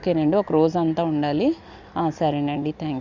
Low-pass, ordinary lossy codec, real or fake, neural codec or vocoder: 7.2 kHz; Opus, 64 kbps; real; none